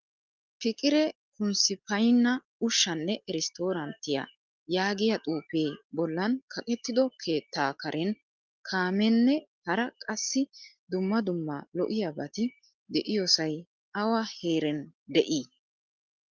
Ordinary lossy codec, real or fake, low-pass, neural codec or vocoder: Opus, 24 kbps; real; 7.2 kHz; none